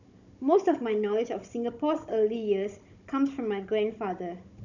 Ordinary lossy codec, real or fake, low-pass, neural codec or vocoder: none; fake; 7.2 kHz; codec, 16 kHz, 16 kbps, FunCodec, trained on Chinese and English, 50 frames a second